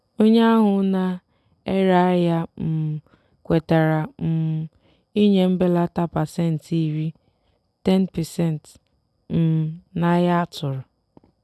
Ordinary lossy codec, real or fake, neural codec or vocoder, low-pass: none; real; none; none